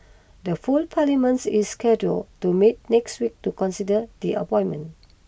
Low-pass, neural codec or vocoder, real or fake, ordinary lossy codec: none; none; real; none